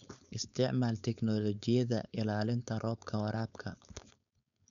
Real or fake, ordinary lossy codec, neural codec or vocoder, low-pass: fake; none; codec, 16 kHz, 4.8 kbps, FACodec; 7.2 kHz